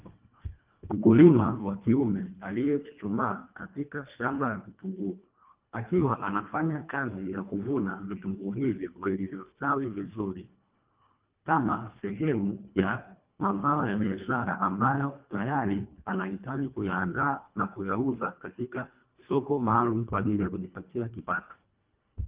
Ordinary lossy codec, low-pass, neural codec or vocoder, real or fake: Opus, 32 kbps; 3.6 kHz; codec, 24 kHz, 1.5 kbps, HILCodec; fake